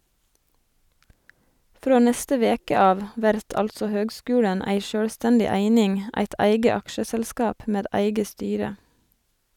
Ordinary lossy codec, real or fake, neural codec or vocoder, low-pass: none; real; none; 19.8 kHz